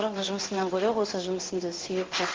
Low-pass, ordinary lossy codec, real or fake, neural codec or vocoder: 7.2 kHz; Opus, 16 kbps; fake; codec, 16 kHz in and 24 kHz out, 1 kbps, XY-Tokenizer